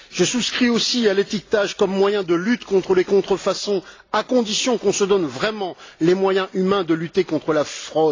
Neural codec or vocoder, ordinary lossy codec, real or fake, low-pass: none; AAC, 32 kbps; real; 7.2 kHz